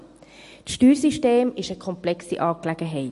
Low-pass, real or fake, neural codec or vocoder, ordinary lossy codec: 14.4 kHz; real; none; MP3, 48 kbps